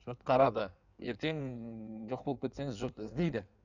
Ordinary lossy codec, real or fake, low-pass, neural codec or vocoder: none; fake; 7.2 kHz; codec, 16 kHz in and 24 kHz out, 1.1 kbps, FireRedTTS-2 codec